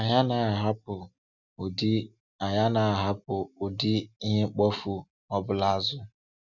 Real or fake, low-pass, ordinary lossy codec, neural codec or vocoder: real; 7.2 kHz; none; none